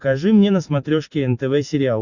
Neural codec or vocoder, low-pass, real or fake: none; 7.2 kHz; real